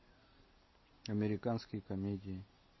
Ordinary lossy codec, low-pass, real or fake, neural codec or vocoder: MP3, 24 kbps; 7.2 kHz; real; none